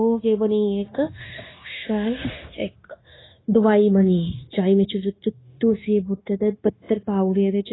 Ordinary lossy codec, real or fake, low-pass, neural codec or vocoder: AAC, 16 kbps; fake; 7.2 kHz; codec, 16 kHz, 0.9 kbps, LongCat-Audio-Codec